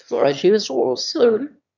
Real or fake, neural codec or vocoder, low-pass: fake; autoencoder, 22.05 kHz, a latent of 192 numbers a frame, VITS, trained on one speaker; 7.2 kHz